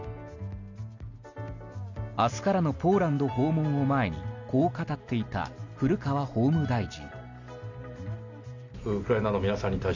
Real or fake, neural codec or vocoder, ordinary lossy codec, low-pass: real; none; none; 7.2 kHz